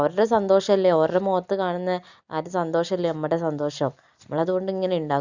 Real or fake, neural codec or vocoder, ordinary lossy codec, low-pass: real; none; Opus, 64 kbps; 7.2 kHz